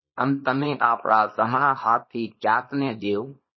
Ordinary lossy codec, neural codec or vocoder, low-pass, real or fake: MP3, 24 kbps; codec, 24 kHz, 0.9 kbps, WavTokenizer, small release; 7.2 kHz; fake